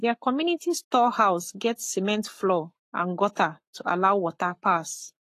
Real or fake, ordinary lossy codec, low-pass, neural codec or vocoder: fake; AAC, 48 kbps; 14.4 kHz; codec, 44.1 kHz, 7.8 kbps, Pupu-Codec